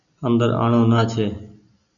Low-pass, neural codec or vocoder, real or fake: 7.2 kHz; none; real